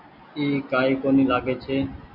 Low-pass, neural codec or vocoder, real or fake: 5.4 kHz; none; real